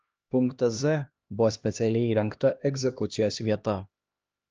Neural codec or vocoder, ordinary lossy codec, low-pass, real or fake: codec, 16 kHz, 1 kbps, X-Codec, HuBERT features, trained on LibriSpeech; Opus, 24 kbps; 7.2 kHz; fake